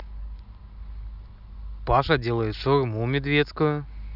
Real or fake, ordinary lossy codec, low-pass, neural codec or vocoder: real; none; 5.4 kHz; none